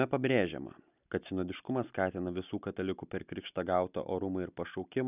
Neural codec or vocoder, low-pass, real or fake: none; 3.6 kHz; real